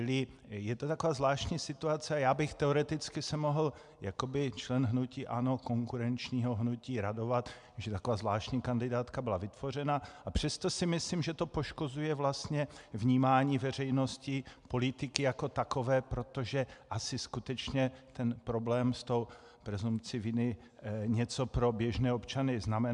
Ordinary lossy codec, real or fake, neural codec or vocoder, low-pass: MP3, 96 kbps; real; none; 10.8 kHz